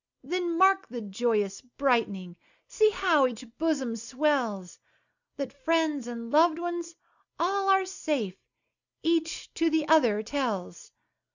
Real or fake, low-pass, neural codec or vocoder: real; 7.2 kHz; none